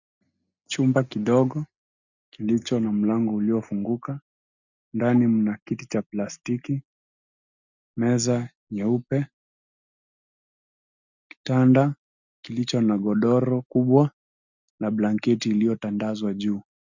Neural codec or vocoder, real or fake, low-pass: none; real; 7.2 kHz